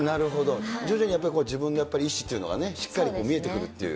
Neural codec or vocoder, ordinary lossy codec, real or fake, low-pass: none; none; real; none